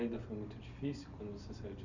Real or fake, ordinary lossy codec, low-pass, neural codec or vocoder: real; none; 7.2 kHz; none